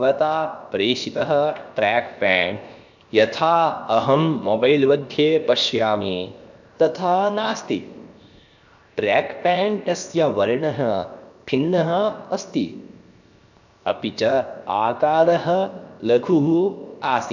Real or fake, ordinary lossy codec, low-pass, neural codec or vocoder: fake; none; 7.2 kHz; codec, 16 kHz, 0.7 kbps, FocalCodec